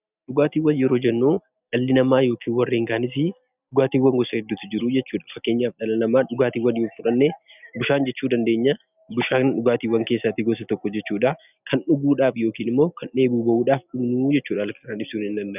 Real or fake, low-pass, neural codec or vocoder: real; 3.6 kHz; none